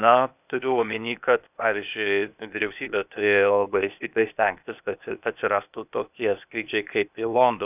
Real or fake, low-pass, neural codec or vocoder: fake; 3.6 kHz; codec, 16 kHz, 0.8 kbps, ZipCodec